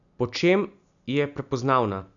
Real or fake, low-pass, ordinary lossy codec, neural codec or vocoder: real; 7.2 kHz; none; none